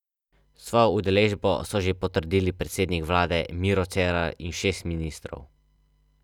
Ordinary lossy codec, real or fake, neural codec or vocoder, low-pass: none; real; none; 19.8 kHz